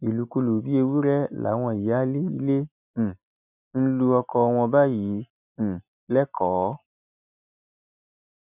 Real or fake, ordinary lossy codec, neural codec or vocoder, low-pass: real; none; none; 3.6 kHz